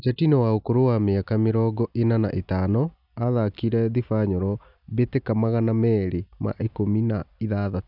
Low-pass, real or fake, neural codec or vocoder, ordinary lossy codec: 5.4 kHz; real; none; none